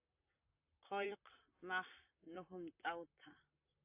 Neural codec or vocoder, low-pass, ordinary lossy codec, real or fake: vocoder, 44.1 kHz, 128 mel bands, Pupu-Vocoder; 3.6 kHz; AAC, 32 kbps; fake